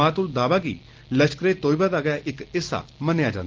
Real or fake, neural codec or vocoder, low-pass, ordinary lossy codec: real; none; 7.2 kHz; Opus, 16 kbps